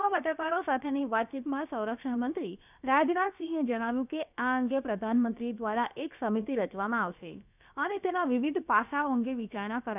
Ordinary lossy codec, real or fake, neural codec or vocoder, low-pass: none; fake; codec, 16 kHz, about 1 kbps, DyCAST, with the encoder's durations; 3.6 kHz